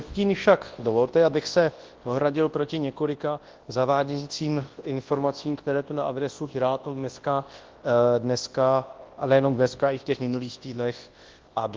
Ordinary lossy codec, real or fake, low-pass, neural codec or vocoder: Opus, 16 kbps; fake; 7.2 kHz; codec, 24 kHz, 0.9 kbps, WavTokenizer, large speech release